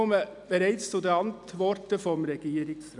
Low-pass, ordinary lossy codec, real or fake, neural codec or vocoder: 10.8 kHz; none; real; none